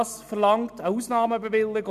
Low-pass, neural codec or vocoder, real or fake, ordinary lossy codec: 14.4 kHz; none; real; none